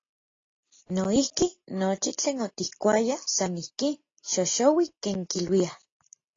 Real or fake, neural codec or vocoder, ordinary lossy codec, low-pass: real; none; AAC, 32 kbps; 7.2 kHz